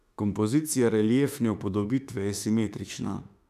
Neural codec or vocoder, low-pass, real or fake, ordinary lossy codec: autoencoder, 48 kHz, 32 numbers a frame, DAC-VAE, trained on Japanese speech; 14.4 kHz; fake; none